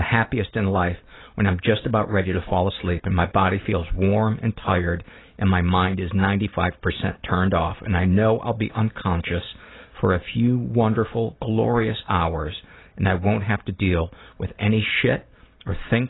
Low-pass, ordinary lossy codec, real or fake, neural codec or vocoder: 7.2 kHz; AAC, 16 kbps; real; none